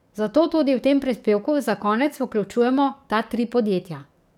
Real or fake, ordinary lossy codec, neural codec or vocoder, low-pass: fake; none; codec, 44.1 kHz, 7.8 kbps, DAC; 19.8 kHz